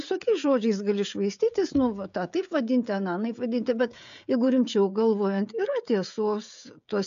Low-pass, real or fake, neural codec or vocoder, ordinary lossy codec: 7.2 kHz; fake; codec, 16 kHz, 16 kbps, FreqCodec, smaller model; MP3, 64 kbps